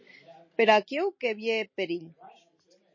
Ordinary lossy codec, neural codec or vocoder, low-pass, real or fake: MP3, 32 kbps; none; 7.2 kHz; real